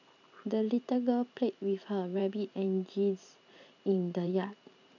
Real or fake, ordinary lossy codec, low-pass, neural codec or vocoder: fake; none; 7.2 kHz; vocoder, 44.1 kHz, 128 mel bands every 512 samples, BigVGAN v2